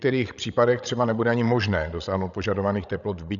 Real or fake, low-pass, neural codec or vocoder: fake; 7.2 kHz; codec, 16 kHz, 16 kbps, FreqCodec, larger model